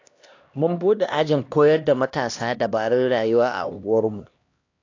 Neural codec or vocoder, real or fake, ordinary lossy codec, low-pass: codec, 16 kHz, 1 kbps, X-Codec, HuBERT features, trained on LibriSpeech; fake; AAC, 48 kbps; 7.2 kHz